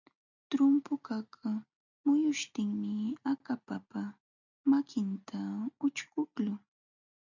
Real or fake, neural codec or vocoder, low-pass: real; none; 7.2 kHz